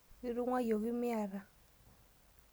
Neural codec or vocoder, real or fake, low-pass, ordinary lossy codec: none; real; none; none